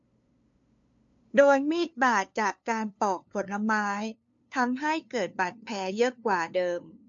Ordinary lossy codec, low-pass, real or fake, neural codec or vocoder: MP3, 48 kbps; 7.2 kHz; fake; codec, 16 kHz, 2 kbps, FunCodec, trained on LibriTTS, 25 frames a second